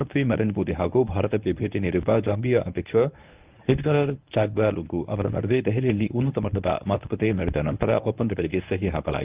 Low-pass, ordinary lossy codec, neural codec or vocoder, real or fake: 3.6 kHz; Opus, 32 kbps; codec, 24 kHz, 0.9 kbps, WavTokenizer, medium speech release version 1; fake